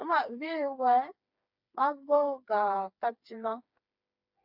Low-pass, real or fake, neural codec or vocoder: 5.4 kHz; fake; codec, 16 kHz, 4 kbps, FreqCodec, smaller model